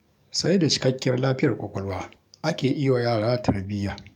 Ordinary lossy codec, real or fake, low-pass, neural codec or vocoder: none; fake; 19.8 kHz; codec, 44.1 kHz, 7.8 kbps, DAC